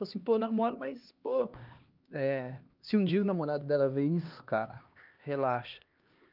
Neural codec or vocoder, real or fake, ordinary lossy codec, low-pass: codec, 16 kHz, 2 kbps, X-Codec, HuBERT features, trained on LibriSpeech; fake; Opus, 24 kbps; 5.4 kHz